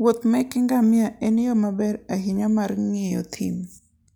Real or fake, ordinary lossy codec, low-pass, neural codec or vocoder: real; none; none; none